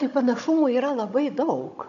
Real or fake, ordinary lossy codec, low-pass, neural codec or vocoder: fake; MP3, 96 kbps; 7.2 kHz; codec, 16 kHz, 16 kbps, FunCodec, trained on Chinese and English, 50 frames a second